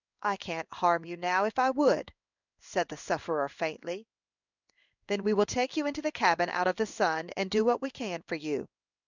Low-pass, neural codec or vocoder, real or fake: 7.2 kHz; codec, 16 kHz in and 24 kHz out, 1 kbps, XY-Tokenizer; fake